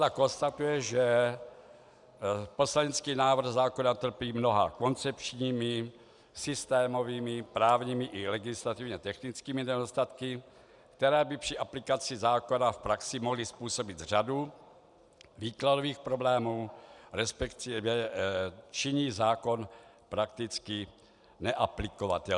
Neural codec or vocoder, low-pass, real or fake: none; 10.8 kHz; real